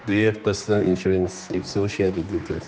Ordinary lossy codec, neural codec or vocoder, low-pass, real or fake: none; codec, 16 kHz, 2 kbps, X-Codec, HuBERT features, trained on general audio; none; fake